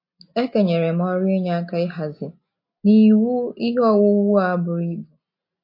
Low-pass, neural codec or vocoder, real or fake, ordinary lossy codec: 5.4 kHz; none; real; MP3, 32 kbps